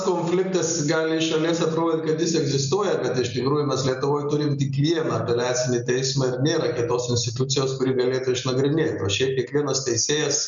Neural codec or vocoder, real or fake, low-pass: none; real; 7.2 kHz